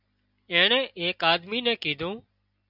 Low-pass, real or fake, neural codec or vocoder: 5.4 kHz; real; none